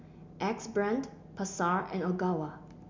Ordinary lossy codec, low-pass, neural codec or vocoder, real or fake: MP3, 64 kbps; 7.2 kHz; none; real